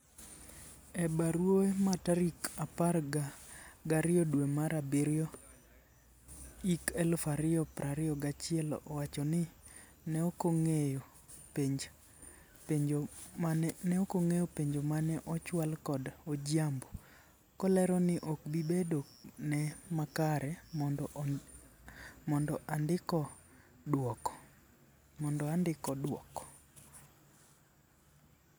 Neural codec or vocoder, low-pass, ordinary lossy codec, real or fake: none; none; none; real